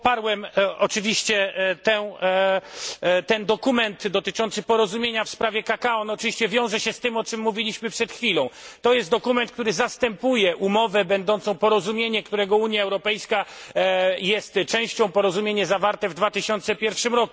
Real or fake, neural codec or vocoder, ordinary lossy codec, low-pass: real; none; none; none